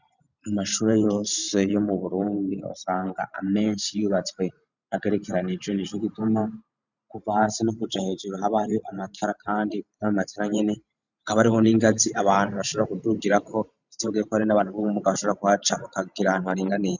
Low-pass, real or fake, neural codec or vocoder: 7.2 kHz; fake; vocoder, 44.1 kHz, 128 mel bands every 512 samples, BigVGAN v2